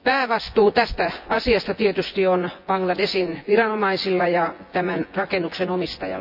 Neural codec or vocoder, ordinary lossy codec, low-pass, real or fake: vocoder, 24 kHz, 100 mel bands, Vocos; AAC, 48 kbps; 5.4 kHz; fake